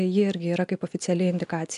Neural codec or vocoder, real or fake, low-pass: none; real; 10.8 kHz